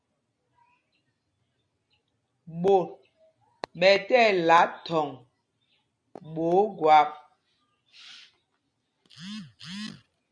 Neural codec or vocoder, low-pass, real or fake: none; 9.9 kHz; real